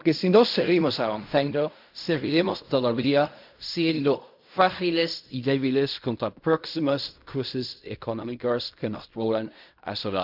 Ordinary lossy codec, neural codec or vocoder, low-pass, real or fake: MP3, 48 kbps; codec, 16 kHz in and 24 kHz out, 0.4 kbps, LongCat-Audio-Codec, fine tuned four codebook decoder; 5.4 kHz; fake